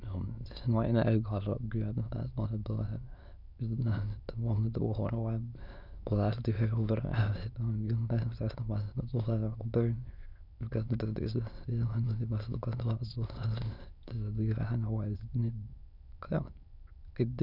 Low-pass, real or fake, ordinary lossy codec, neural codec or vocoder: 5.4 kHz; fake; none; autoencoder, 22.05 kHz, a latent of 192 numbers a frame, VITS, trained on many speakers